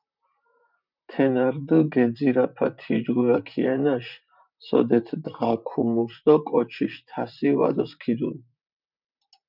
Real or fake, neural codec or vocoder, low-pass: fake; vocoder, 44.1 kHz, 128 mel bands, Pupu-Vocoder; 5.4 kHz